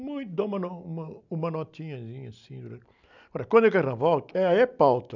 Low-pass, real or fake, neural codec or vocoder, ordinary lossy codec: 7.2 kHz; real; none; none